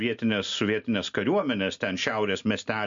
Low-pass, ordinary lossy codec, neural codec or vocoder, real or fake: 7.2 kHz; MP3, 48 kbps; none; real